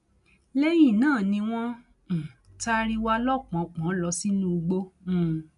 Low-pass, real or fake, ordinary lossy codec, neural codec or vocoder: 10.8 kHz; real; none; none